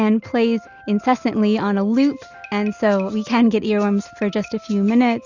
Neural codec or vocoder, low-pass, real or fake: none; 7.2 kHz; real